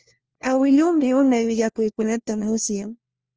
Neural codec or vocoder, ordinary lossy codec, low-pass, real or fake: codec, 16 kHz, 1 kbps, FunCodec, trained on LibriTTS, 50 frames a second; Opus, 24 kbps; 7.2 kHz; fake